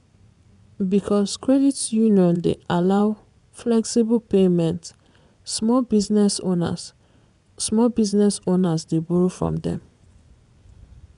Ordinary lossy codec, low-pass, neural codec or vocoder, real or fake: none; 10.8 kHz; vocoder, 24 kHz, 100 mel bands, Vocos; fake